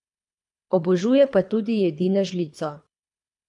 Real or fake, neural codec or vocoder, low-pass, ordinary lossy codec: fake; codec, 24 kHz, 3 kbps, HILCodec; 10.8 kHz; none